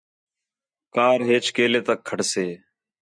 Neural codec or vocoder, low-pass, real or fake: none; 9.9 kHz; real